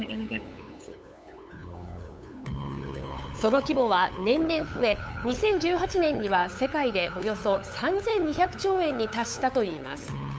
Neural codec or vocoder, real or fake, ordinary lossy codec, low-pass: codec, 16 kHz, 8 kbps, FunCodec, trained on LibriTTS, 25 frames a second; fake; none; none